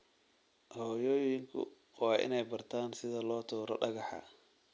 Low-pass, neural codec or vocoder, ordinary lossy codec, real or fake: none; none; none; real